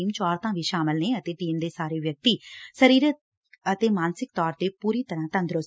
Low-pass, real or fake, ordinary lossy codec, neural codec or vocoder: none; real; none; none